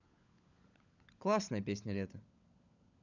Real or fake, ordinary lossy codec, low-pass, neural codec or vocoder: fake; none; 7.2 kHz; codec, 16 kHz, 16 kbps, FunCodec, trained on LibriTTS, 50 frames a second